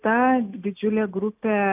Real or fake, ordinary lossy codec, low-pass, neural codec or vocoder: real; AAC, 32 kbps; 3.6 kHz; none